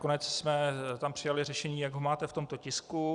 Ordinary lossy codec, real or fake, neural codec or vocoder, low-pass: Opus, 64 kbps; real; none; 10.8 kHz